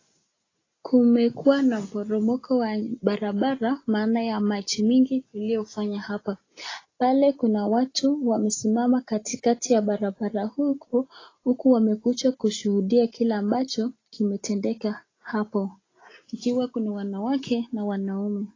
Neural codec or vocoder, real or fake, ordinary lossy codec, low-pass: none; real; AAC, 32 kbps; 7.2 kHz